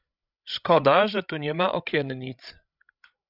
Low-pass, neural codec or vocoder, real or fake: 5.4 kHz; codec, 16 kHz, 16 kbps, FreqCodec, larger model; fake